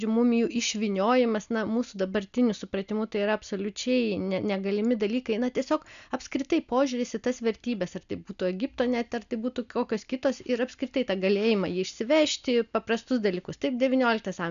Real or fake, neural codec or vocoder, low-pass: real; none; 7.2 kHz